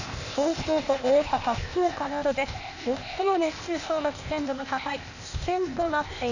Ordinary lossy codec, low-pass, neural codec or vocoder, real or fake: none; 7.2 kHz; codec, 16 kHz, 0.8 kbps, ZipCodec; fake